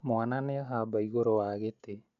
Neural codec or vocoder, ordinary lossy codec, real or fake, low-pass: none; none; real; 7.2 kHz